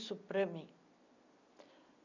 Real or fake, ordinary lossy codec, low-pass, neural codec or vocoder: real; none; 7.2 kHz; none